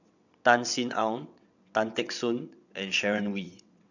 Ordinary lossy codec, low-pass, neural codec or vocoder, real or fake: none; 7.2 kHz; vocoder, 22.05 kHz, 80 mel bands, WaveNeXt; fake